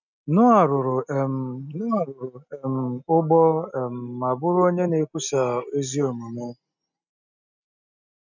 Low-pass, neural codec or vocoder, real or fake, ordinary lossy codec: 7.2 kHz; none; real; none